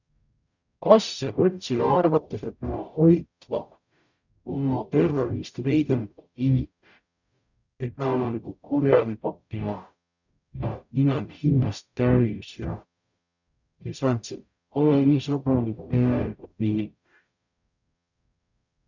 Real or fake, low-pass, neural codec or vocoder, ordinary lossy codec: fake; 7.2 kHz; codec, 44.1 kHz, 0.9 kbps, DAC; none